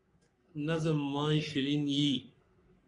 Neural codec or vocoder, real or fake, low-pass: codec, 44.1 kHz, 3.4 kbps, Pupu-Codec; fake; 10.8 kHz